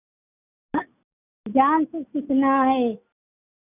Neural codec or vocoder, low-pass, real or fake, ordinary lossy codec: none; 3.6 kHz; real; none